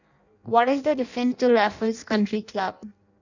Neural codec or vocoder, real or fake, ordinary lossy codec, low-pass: codec, 16 kHz in and 24 kHz out, 0.6 kbps, FireRedTTS-2 codec; fake; none; 7.2 kHz